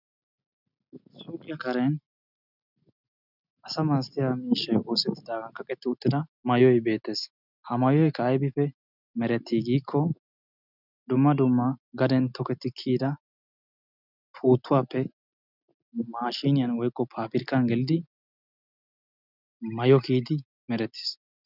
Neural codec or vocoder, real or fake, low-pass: none; real; 5.4 kHz